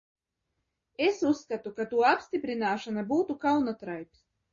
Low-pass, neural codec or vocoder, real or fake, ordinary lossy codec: 7.2 kHz; none; real; MP3, 32 kbps